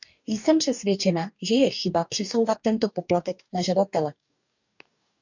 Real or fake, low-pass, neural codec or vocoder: fake; 7.2 kHz; codec, 44.1 kHz, 2.6 kbps, DAC